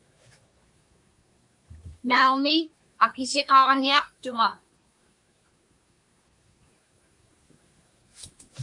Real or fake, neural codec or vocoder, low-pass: fake; codec, 24 kHz, 1 kbps, SNAC; 10.8 kHz